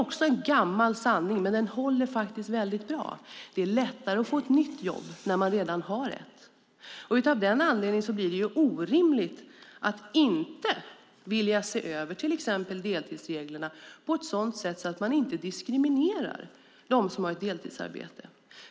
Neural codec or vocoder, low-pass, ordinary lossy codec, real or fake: none; none; none; real